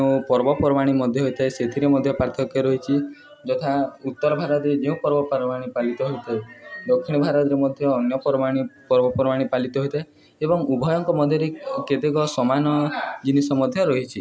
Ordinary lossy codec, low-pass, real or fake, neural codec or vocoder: none; none; real; none